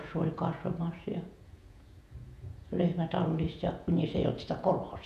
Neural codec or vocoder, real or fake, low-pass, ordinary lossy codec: vocoder, 48 kHz, 128 mel bands, Vocos; fake; 14.4 kHz; AAC, 96 kbps